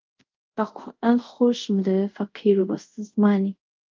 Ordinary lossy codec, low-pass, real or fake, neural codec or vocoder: Opus, 24 kbps; 7.2 kHz; fake; codec, 24 kHz, 0.5 kbps, DualCodec